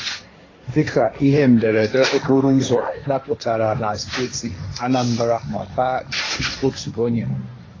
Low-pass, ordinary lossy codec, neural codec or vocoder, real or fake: 7.2 kHz; AAC, 32 kbps; codec, 16 kHz, 1.1 kbps, Voila-Tokenizer; fake